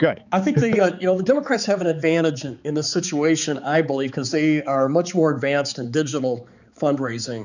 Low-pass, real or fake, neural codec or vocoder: 7.2 kHz; fake; codec, 16 kHz, 4 kbps, X-Codec, HuBERT features, trained on balanced general audio